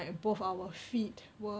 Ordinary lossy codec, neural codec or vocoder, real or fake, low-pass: none; none; real; none